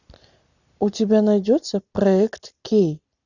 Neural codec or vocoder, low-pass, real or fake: none; 7.2 kHz; real